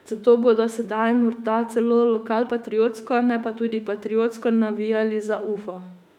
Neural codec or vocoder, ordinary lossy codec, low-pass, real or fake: autoencoder, 48 kHz, 32 numbers a frame, DAC-VAE, trained on Japanese speech; none; 19.8 kHz; fake